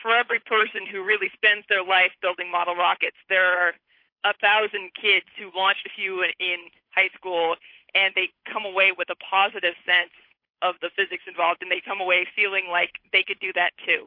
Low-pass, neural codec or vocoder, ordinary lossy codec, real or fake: 5.4 kHz; codec, 16 kHz, 8 kbps, FunCodec, trained on Chinese and English, 25 frames a second; MP3, 32 kbps; fake